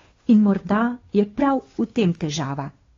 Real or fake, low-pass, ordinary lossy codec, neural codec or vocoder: fake; 7.2 kHz; AAC, 32 kbps; codec, 16 kHz, 2 kbps, FunCodec, trained on Chinese and English, 25 frames a second